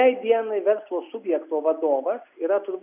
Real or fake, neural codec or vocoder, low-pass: real; none; 3.6 kHz